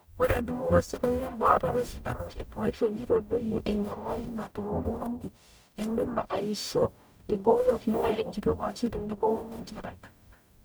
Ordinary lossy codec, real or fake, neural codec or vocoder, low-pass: none; fake; codec, 44.1 kHz, 0.9 kbps, DAC; none